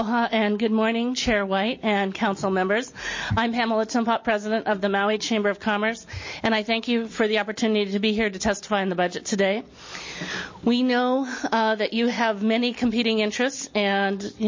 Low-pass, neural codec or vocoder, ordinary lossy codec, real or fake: 7.2 kHz; none; MP3, 32 kbps; real